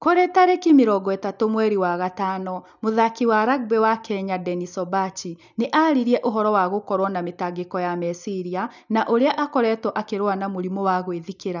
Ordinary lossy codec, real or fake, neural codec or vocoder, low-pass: none; real; none; 7.2 kHz